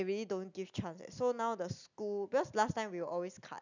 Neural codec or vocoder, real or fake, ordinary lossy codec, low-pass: none; real; none; 7.2 kHz